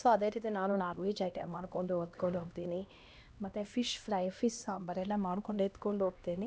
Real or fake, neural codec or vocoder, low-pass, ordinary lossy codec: fake; codec, 16 kHz, 1 kbps, X-Codec, HuBERT features, trained on LibriSpeech; none; none